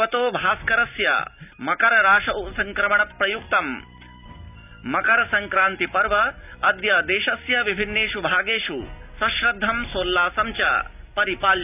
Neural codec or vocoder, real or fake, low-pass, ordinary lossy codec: none; real; 3.6 kHz; none